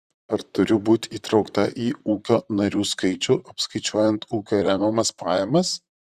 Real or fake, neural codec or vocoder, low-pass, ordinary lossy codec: fake; vocoder, 44.1 kHz, 128 mel bands, Pupu-Vocoder; 14.4 kHz; Opus, 64 kbps